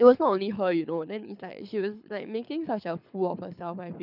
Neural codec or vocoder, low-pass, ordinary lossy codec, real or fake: codec, 24 kHz, 6 kbps, HILCodec; 5.4 kHz; none; fake